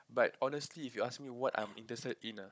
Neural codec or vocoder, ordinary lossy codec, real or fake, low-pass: codec, 16 kHz, 16 kbps, FunCodec, trained on Chinese and English, 50 frames a second; none; fake; none